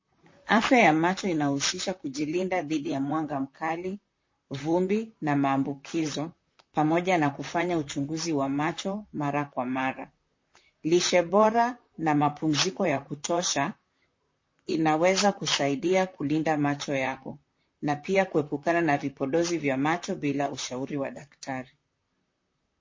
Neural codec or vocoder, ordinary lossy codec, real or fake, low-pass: vocoder, 44.1 kHz, 128 mel bands, Pupu-Vocoder; MP3, 32 kbps; fake; 7.2 kHz